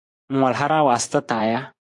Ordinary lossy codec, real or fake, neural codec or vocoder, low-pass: AAC, 64 kbps; fake; vocoder, 24 kHz, 100 mel bands, Vocos; 10.8 kHz